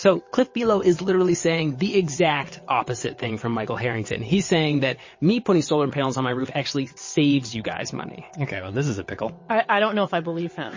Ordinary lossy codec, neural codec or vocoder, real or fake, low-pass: MP3, 32 kbps; vocoder, 22.05 kHz, 80 mel bands, WaveNeXt; fake; 7.2 kHz